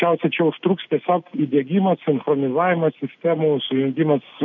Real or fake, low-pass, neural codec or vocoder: real; 7.2 kHz; none